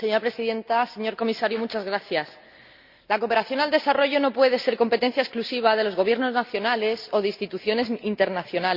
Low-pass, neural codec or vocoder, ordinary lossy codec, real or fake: 5.4 kHz; none; Opus, 64 kbps; real